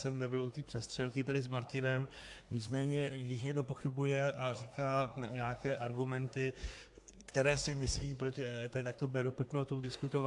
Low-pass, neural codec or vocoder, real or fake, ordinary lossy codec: 10.8 kHz; codec, 24 kHz, 1 kbps, SNAC; fake; AAC, 64 kbps